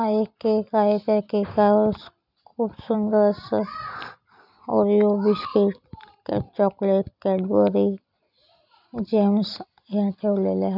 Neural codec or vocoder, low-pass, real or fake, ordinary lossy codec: none; 5.4 kHz; real; none